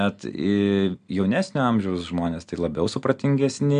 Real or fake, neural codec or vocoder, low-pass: real; none; 9.9 kHz